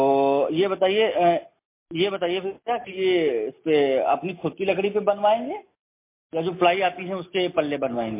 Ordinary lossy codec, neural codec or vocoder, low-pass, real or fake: MP3, 24 kbps; none; 3.6 kHz; real